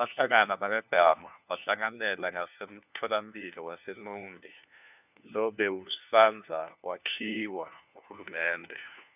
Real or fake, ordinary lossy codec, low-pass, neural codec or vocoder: fake; none; 3.6 kHz; codec, 16 kHz, 1 kbps, FunCodec, trained on LibriTTS, 50 frames a second